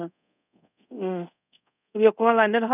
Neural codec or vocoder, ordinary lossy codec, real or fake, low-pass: codec, 24 kHz, 0.5 kbps, DualCodec; none; fake; 3.6 kHz